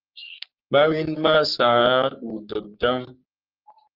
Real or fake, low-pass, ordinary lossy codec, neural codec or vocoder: fake; 5.4 kHz; Opus, 32 kbps; codec, 44.1 kHz, 3.4 kbps, Pupu-Codec